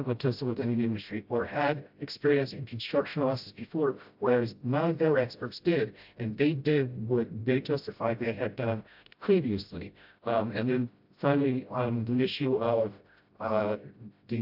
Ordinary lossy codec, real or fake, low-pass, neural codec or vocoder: AAC, 48 kbps; fake; 5.4 kHz; codec, 16 kHz, 0.5 kbps, FreqCodec, smaller model